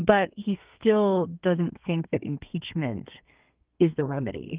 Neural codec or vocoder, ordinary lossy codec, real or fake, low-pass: codec, 32 kHz, 1.9 kbps, SNAC; Opus, 32 kbps; fake; 3.6 kHz